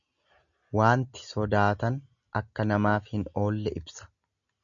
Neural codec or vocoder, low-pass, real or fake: none; 7.2 kHz; real